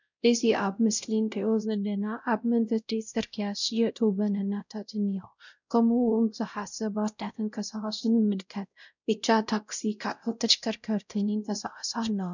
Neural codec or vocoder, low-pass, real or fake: codec, 16 kHz, 0.5 kbps, X-Codec, WavLM features, trained on Multilingual LibriSpeech; 7.2 kHz; fake